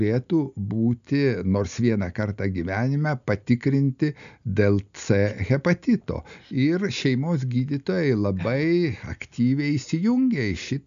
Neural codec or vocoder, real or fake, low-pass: none; real; 7.2 kHz